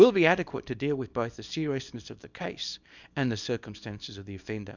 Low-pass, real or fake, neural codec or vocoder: 7.2 kHz; fake; codec, 24 kHz, 0.9 kbps, WavTokenizer, small release